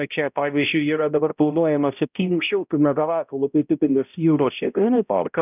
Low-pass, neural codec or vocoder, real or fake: 3.6 kHz; codec, 16 kHz, 0.5 kbps, X-Codec, HuBERT features, trained on balanced general audio; fake